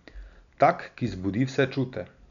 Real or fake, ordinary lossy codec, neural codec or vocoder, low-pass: real; none; none; 7.2 kHz